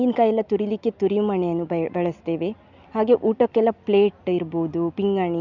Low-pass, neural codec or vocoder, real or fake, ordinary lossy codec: 7.2 kHz; none; real; none